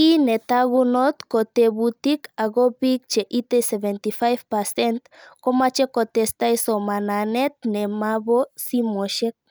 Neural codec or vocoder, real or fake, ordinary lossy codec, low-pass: none; real; none; none